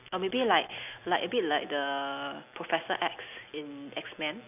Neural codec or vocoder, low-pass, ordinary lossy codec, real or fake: none; 3.6 kHz; none; real